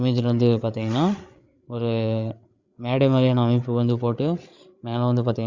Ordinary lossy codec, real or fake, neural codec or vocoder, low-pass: Opus, 64 kbps; fake; codec, 16 kHz, 8 kbps, FreqCodec, larger model; 7.2 kHz